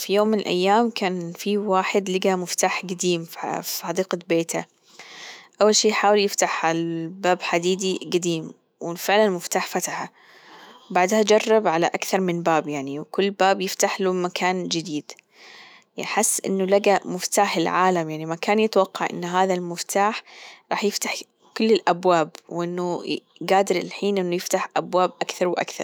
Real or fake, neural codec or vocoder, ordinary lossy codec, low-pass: fake; autoencoder, 48 kHz, 128 numbers a frame, DAC-VAE, trained on Japanese speech; none; none